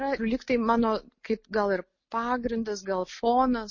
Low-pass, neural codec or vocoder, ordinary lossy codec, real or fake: 7.2 kHz; none; MP3, 32 kbps; real